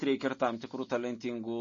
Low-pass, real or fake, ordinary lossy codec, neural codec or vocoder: 7.2 kHz; real; MP3, 32 kbps; none